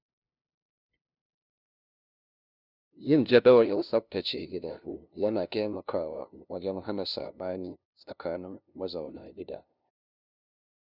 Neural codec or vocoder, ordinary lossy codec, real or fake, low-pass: codec, 16 kHz, 0.5 kbps, FunCodec, trained on LibriTTS, 25 frames a second; none; fake; 5.4 kHz